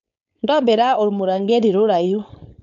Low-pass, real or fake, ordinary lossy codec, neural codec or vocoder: 7.2 kHz; fake; none; codec, 16 kHz, 4.8 kbps, FACodec